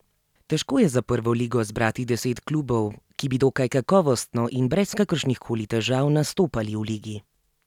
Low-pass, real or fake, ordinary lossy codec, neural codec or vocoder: 19.8 kHz; real; none; none